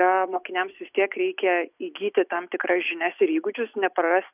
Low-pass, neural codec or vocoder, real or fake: 3.6 kHz; none; real